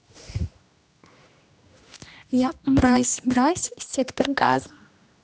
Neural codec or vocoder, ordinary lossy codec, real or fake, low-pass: codec, 16 kHz, 1 kbps, X-Codec, HuBERT features, trained on general audio; none; fake; none